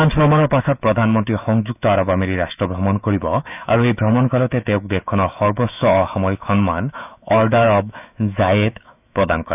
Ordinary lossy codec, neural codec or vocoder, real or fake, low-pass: none; autoencoder, 48 kHz, 128 numbers a frame, DAC-VAE, trained on Japanese speech; fake; 3.6 kHz